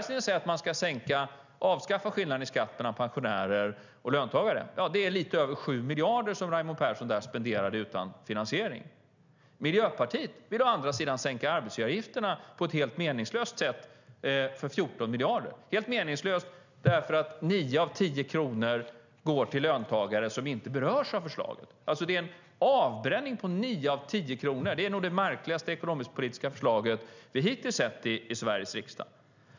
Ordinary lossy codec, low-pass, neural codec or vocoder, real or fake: none; 7.2 kHz; none; real